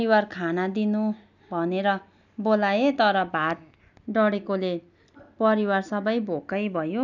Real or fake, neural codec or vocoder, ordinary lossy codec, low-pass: real; none; none; 7.2 kHz